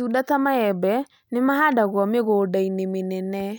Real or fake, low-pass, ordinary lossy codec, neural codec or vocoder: real; none; none; none